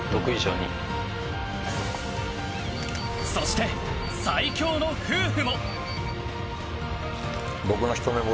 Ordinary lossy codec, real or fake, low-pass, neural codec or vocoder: none; real; none; none